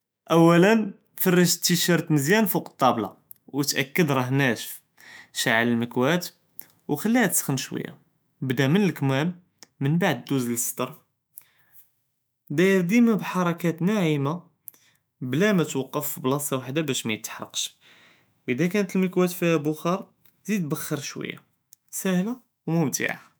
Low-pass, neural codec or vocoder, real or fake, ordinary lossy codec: none; autoencoder, 48 kHz, 128 numbers a frame, DAC-VAE, trained on Japanese speech; fake; none